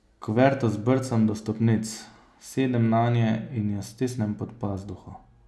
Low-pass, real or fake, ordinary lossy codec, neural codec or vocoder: none; real; none; none